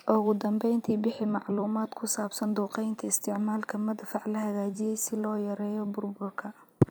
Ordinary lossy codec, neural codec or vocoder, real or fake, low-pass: none; vocoder, 44.1 kHz, 128 mel bands every 256 samples, BigVGAN v2; fake; none